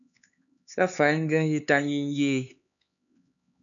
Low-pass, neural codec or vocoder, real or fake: 7.2 kHz; codec, 16 kHz, 4 kbps, X-Codec, HuBERT features, trained on LibriSpeech; fake